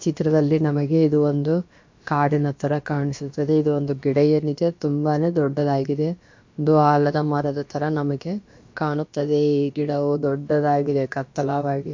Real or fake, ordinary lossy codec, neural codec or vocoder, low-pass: fake; MP3, 48 kbps; codec, 16 kHz, about 1 kbps, DyCAST, with the encoder's durations; 7.2 kHz